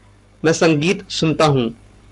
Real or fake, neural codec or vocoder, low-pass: fake; codec, 44.1 kHz, 7.8 kbps, Pupu-Codec; 10.8 kHz